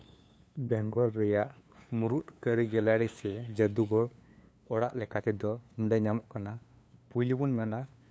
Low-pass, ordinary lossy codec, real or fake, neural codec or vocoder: none; none; fake; codec, 16 kHz, 2 kbps, FunCodec, trained on LibriTTS, 25 frames a second